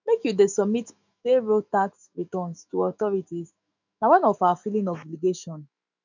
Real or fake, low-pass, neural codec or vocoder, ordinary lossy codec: fake; 7.2 kHz; autoencoder, 48 kHz, 128 numbers a frame, DAC-VAE, trained on Japanese speech; none